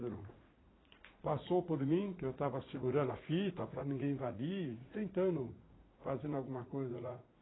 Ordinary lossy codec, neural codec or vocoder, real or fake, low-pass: AAC, 16 kbps; vocoder, 44.1 kHz, 80 mel bands, Vocos; fake; 7.2 kHz